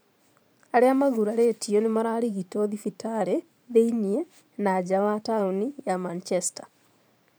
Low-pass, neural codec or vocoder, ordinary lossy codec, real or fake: none; vocoder, 44.1 kHz, 128 mel bands every 512 samples, BigVGAN v2; none; fake